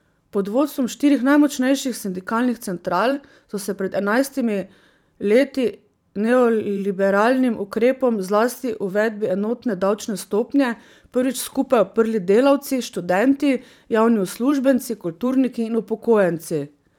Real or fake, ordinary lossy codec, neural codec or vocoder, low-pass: fake; none; vocoder, 44.1 kHz, 128 mel bands every 512 samples, BigVGAN v2; 19.8 kHz